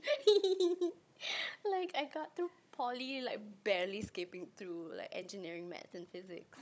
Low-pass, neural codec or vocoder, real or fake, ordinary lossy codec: none; codec, 16 kHz, 16 kbps, FunCodec, trained on Chinese and English, 50 frames a second; fake; none